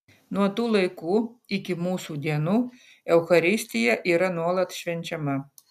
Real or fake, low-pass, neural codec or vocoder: real; 14.4 kHz; none